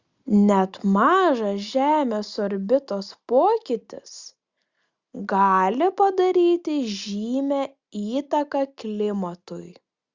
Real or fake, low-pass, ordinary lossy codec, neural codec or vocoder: real; 7.2 kHz; Opus, 64 kbps; none